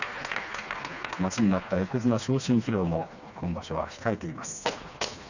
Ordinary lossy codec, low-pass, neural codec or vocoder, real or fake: none; 7.2 kHz; codec, 16 kHz, 2 kbps, FreqCodec, smaller model; fake